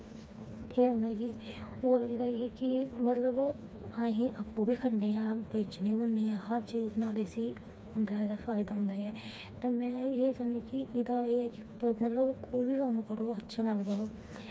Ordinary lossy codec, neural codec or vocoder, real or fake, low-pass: none; codec, 16 kHz, 2 kbps, FreqCodec, smaller model; fake; none